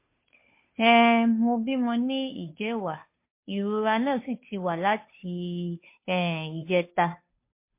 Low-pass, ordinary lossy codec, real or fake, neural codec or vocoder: 3.6 kHz; MP3, 24 kbps; fake; codec, 16 kHz, 2 kbps, FunCodec, trained on Chinese and English, 25 frames a second